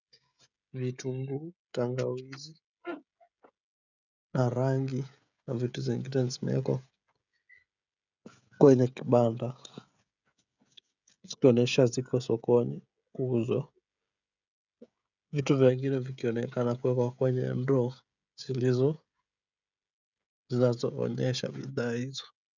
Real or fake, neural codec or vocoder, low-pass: fake; codec, 16 kHz, 16 kbps, FreqCodec, smaller model; 7.2 kHz